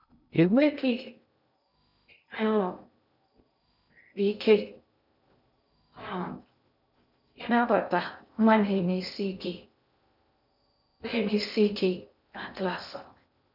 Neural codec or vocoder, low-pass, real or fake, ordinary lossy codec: codec, 16 kHz in and 24 kHz out, 0.6 kbps, FocalCodec, streaming, 2048 codes; 5.4 kHz; fake; none